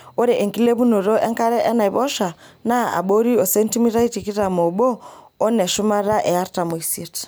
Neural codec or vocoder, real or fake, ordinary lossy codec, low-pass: none; real; none; none